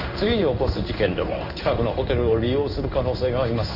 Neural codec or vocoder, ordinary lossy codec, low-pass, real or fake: codec, 16 kHz in and 24 kHz out, 1 kbps, XY-Tokenizer; none; 5.4 kHz; fake